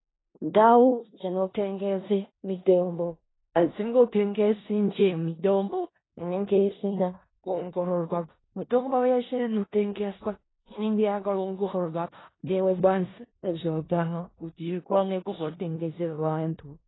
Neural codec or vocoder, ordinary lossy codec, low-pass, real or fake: codec, 16 kHz in and 24 kHz out, 0.4 kbps, LongCat-Audio-Codec, four codebook decoder; AAC, 16 kbps; 7.2 kHz; fake